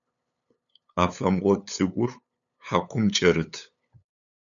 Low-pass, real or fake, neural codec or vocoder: 7.2 kHz; fake; codec, 16 kHz, 8 kbps, FunCodec, trained on LibriTTS, 25 frames a second